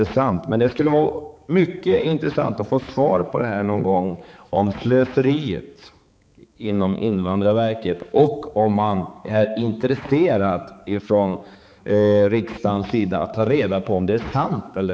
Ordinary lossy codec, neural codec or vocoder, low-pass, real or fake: none; codec, 16 kHz, 4 kbps, X-Codec, HuBERT features, trained on balanced general audio; none; fake